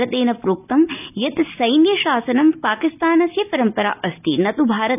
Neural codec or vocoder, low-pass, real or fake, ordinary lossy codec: none; 3.6 kHz; real; none